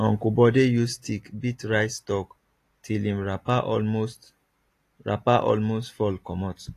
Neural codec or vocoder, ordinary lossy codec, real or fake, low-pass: none; AAC, 48 kbps; real; 14.4 kHz